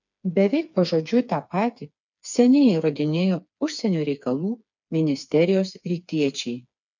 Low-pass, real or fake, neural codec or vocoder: 7.2 kHz; fake; codec, 16 kHz, 4 kbps, FreqCodec, smaller model